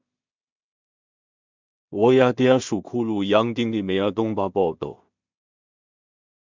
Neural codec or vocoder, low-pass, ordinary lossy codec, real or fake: codec, 16 kHz in and 24 kHz out, 0.4 kbps, LongCat-Audio-Codec, two codebook decoder; 7.2 kHz; MP3, 64 kbps; fake